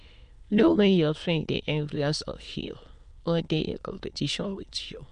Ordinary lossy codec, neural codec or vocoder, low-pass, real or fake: MP3, 64 kbps; autoencoder, 22.05 kHz, a latent of 192 numbers a frame, VITS, trained on many speakers; 9.9 kHz; fake